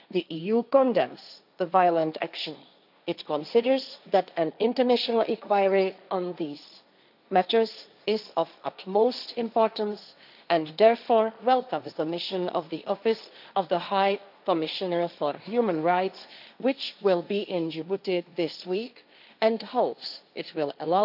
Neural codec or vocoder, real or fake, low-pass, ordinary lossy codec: codec, 16 kHz, 1.1 kbps, Voila-Tokenizer; fake; 5.4 kHz; none